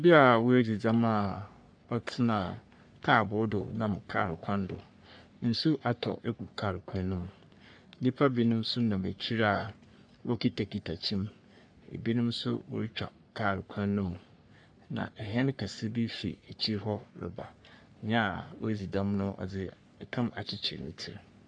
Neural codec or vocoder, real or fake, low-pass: codec, 44.1 kHz, 3.4 kbps, Pupu-Codec; fake; 9.9 kHz